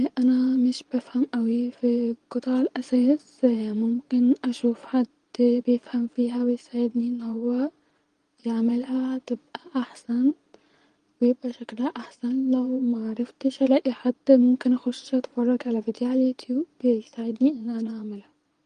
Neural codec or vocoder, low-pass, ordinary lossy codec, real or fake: vocoder, 22.05 kHz, 80 mel bands, Vocos; 9.9 kHz; Opus, 24 kbps; fake